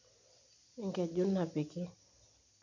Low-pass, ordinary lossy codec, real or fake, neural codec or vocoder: 7.2 kHz; none; real; none